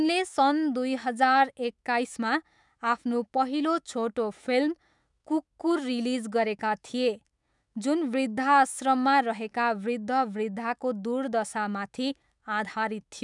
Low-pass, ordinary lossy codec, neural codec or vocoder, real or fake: 10.8 kHz; none; autoencoder, 48 kHz, 128 numbers a frame, DAC-VAE, trained on Japanese speech; fake